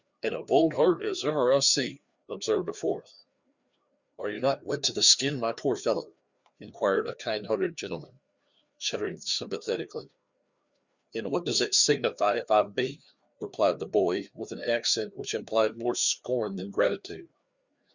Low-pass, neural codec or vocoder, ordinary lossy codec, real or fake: 7.2 kHz; codec, 16 kHz, 2 kbps, FreqCodec, larger model; Opus, 64 kbps; fake